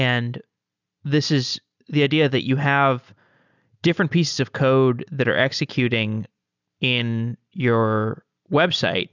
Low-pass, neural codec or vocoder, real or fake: 7.2 kHz; none; real